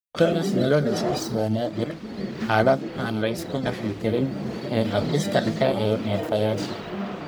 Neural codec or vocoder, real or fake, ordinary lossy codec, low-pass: codec, 44.1 kHz, 1.7 kbps, Pupu-Codec; fake; none; none